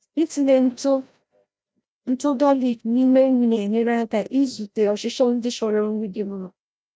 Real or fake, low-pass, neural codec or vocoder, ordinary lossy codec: fake; none; codec, 16 kHz, 0.5 kbps, FreqCodec, larger model; none